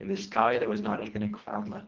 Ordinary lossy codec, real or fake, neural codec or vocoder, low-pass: Opus, 16 kbps; fake; codec, 24 kHz, 1.5 kbps, HILCodec; 7.2 kHz